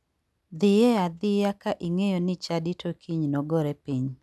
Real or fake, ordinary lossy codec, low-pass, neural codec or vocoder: real; none; none; none